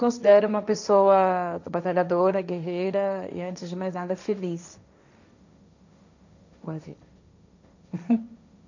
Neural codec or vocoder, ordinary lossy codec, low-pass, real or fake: codec, 16 kHz, 1.1 kbps, Voila-Tokenizer; none; 7.2 kHz; fake